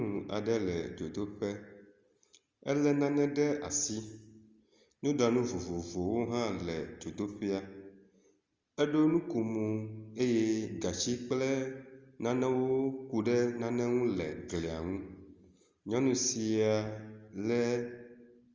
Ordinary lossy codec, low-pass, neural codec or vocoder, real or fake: Opus, 32 kbps; 7.2 kHz; none; real